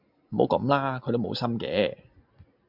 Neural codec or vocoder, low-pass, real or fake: none; 5.4 kHz; real